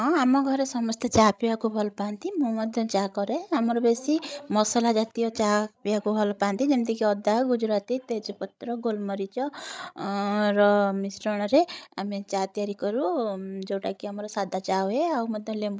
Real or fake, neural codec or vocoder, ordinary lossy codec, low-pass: fake; codec, 16 kHz, 16 kbps, FreqCodec, larger model; none; none